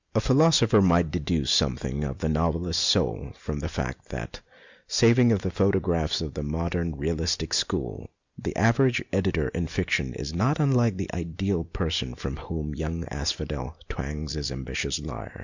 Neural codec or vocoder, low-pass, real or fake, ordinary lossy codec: none; 7.2 kHz; real; Opus, 64 kbps